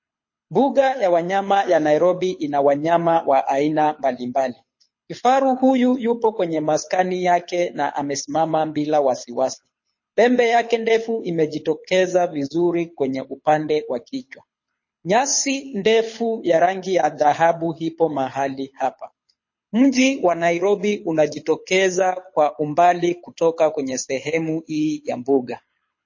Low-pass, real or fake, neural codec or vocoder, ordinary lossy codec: 7.2 kHz; fake; codec, 24 kHz, 6 kbps, HILCodec; MP3, 32 kbps